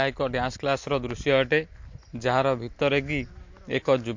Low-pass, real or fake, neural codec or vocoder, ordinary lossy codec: 7.2 kHz; real; none; MP3, 48 kbps